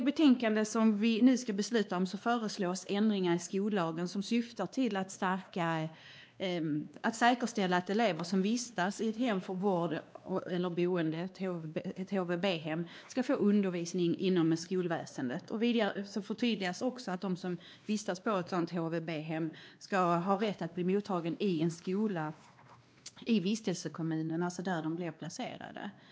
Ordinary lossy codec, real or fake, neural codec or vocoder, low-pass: none; fake; codec, 16 kHz, 2 kbps, X-Codec, WavLM features, trained on Multilingual LibriSpeech; none